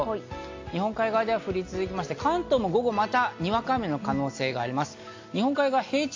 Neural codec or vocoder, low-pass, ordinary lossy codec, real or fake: none; 7.2 kHz; AAC, 48 kbps; real